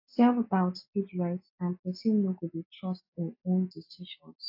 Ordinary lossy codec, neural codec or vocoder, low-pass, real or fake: AAC, 48 kbps; none; 5.4 kHz; real